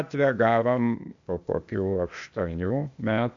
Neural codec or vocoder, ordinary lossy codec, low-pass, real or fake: codec, 16 kHz, 0.8 kbps, ZipCodec; AAC, 64 kbps; 7.2 kHz; fake